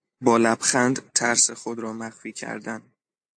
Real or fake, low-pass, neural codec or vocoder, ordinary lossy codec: real; 9.9 kHz; none; AAC, 64 kbps